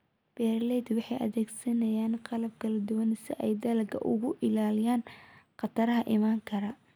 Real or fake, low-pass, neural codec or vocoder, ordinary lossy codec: real; none; none; none